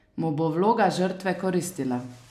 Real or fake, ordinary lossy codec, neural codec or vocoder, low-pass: real; AAC, 96 kbps; none; 14.4 kHz